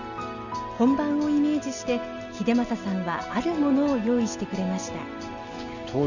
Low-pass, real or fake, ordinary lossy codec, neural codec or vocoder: 7.2 kHz; real; none; none